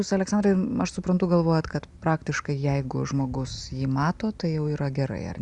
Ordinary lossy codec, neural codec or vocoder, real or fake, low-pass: Opus, 64 kbps; none; real; 10.8 kHz